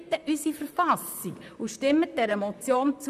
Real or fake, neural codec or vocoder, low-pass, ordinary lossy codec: fake; vocoder, 44.1 kHz, 128 mel bands, Pupu-Vocoder; 14.4 kHz; none